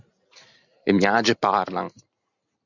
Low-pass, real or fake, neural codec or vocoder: 7.2 kHz; real; none